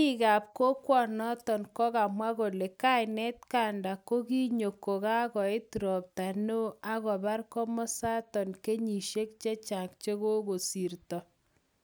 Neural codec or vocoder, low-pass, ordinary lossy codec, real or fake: none; none; none; real